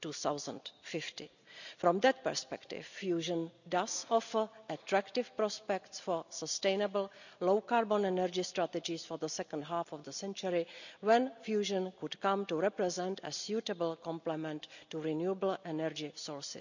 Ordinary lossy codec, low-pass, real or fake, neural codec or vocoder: none; 7.2 kHz; real; none